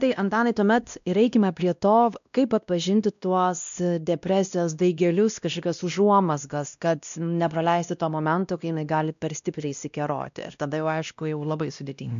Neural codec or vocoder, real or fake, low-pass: codec, 16 kHz, 1 kbps, X-Codec, WavLM features, trained on Multilingual LibriSpeech; fake; 7.2 kHz